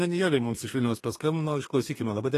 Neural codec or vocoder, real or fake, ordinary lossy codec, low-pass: codec, 32 kHz, 1.9 kbps, SNAC; fake; AAC, 48 kbps; 14.4 kHz